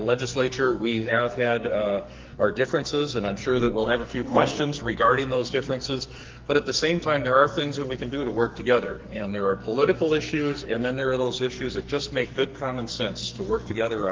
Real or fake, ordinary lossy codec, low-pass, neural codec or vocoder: fake; Opus, 32 kbps; 7.2 kHz; codec, 44.1 kHz, 2.6 kbps, SNAC